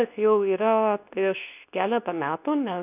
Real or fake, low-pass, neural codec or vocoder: fake; 3.6 kHz; codec, 24 kHz, 0.9 kbps, WavTokenizer, medium speech release version 2